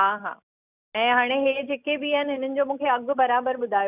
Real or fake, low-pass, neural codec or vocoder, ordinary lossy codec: real; 3.6 kHz; none; none